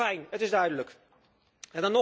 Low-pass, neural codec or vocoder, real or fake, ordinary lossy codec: none; none; real; none